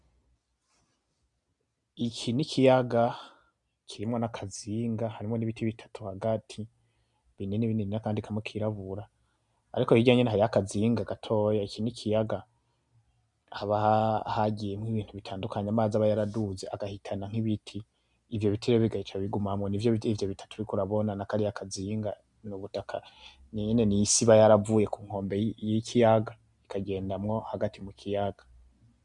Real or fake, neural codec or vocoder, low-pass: real; none; 10.8 kHz